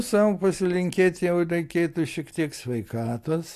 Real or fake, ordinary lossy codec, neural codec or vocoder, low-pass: real; AAC, 64 kbps; none; 14.4 kHz